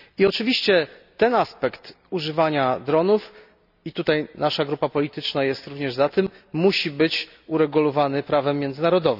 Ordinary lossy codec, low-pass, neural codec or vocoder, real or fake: none; 5.4 kHz; none; real